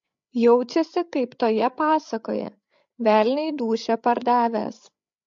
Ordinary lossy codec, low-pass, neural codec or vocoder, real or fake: MP3, 48 kbps; 7.2 kHz; codec, 16 kHz, 8 kbps, FreqCodec, larger model; fake